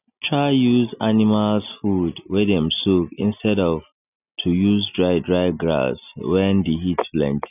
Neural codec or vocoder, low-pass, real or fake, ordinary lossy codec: none; 3.6 kHz; real; none